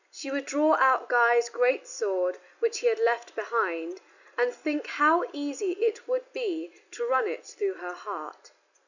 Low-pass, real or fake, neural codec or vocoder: 7.2 kHz; real; none